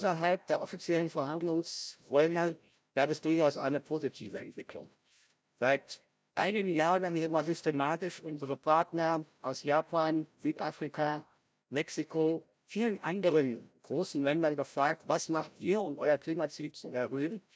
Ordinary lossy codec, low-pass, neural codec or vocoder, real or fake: none; none; codec, 16 kHz, 0.5 kbps, FreqCodec, larger model; fake